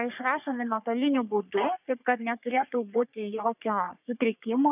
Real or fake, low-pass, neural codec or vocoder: fake; 3.6 kHz; codec, 44.1 kHz, 3.4 kbps, Pupu-Codec